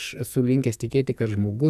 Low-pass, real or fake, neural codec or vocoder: 14.4 kHz; fake; codec, 44.1 kHz, 2.6 kbps, SNAC